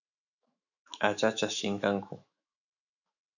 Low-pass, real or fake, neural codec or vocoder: 7.2 kHz; fake; autoencoder, 48 kHz, 128 numbers a frame, DAC-VAE, trained on Japanese speech